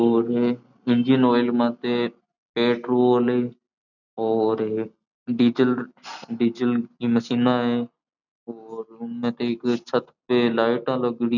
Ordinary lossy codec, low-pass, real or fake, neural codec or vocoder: none; 7.2 kHz; real; none